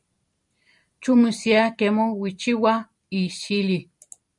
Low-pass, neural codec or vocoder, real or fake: 10.8 kHz; vocoder, 44.1 kHz, 128 mel bands every 256 samples, BigVGAN v2; fake